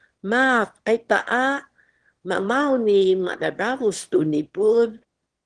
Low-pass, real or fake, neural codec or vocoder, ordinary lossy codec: 9.9 kHz; fake; autoencoder, 22.05 kHz, a latent of 192 numbers a frame, VITS, trained on one speaker; Opus, 16 kbps